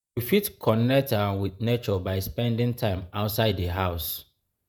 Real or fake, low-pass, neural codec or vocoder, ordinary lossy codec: fake; none; vocoder, 48 kHz, 128 mel bands, Vocos; none